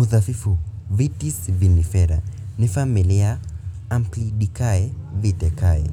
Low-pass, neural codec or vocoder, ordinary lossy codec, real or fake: 19.8 kHz; none; none; real